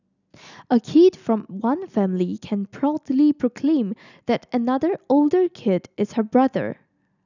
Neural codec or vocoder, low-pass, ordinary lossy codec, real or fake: none; 7.2 kHz; none; real